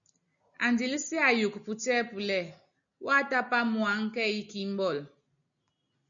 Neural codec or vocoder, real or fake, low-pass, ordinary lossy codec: none; real; 7.2 kHz; MP3, 96 kbps